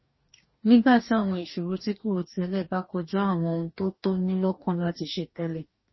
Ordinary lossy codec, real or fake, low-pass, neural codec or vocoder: MP3, 24 kbps; fake; 7.2 kHz; codec, 44.1 kHz, 2.6 kbps, DAC